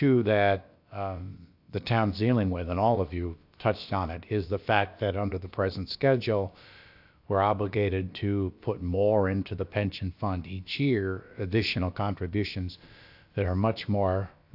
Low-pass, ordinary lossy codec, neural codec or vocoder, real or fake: 5.4 kHz; AAC, 48 kbps; codec, 16 kHz, about 1 kbps, DyCAST, with the encoder's durations; fake